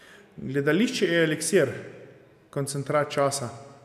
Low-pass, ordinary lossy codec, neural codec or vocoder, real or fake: 14.4 kHz; none; none; real